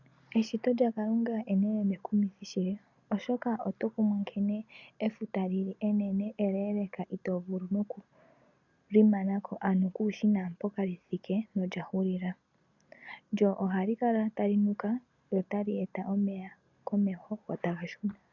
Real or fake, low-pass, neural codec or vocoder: real; 7.2 kHz; none